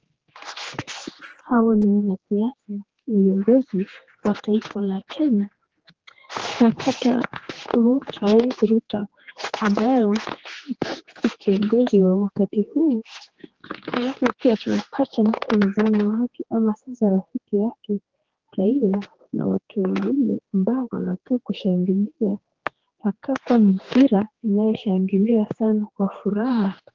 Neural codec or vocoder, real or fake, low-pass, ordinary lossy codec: codec, 16 kHz, 2 kbps, X-Codec, HuBERT features, trained on general audio; fake; 7.2 kHz; Opus, 16 kbps